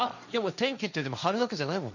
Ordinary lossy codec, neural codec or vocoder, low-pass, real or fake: none; codec, 16 kHz, 1.1 kbps, Voila-Tokenizer; 7.2 kHz; fake